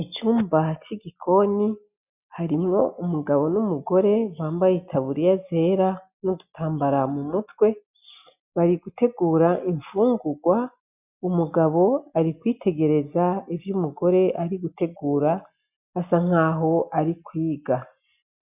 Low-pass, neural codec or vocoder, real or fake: 3.6 kHz; none; real